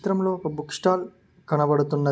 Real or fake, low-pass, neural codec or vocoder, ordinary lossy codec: real; none; none; none